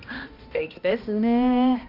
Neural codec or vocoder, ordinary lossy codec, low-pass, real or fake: codec, 16 kHz, 1 kbps, X-Codec, HuBERT features, trained on balanced general audio; none; 5.4 kHz; fake